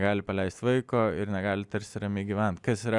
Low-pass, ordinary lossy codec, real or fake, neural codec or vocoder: 10.8 kHz; Opus, 64 kbps; real; none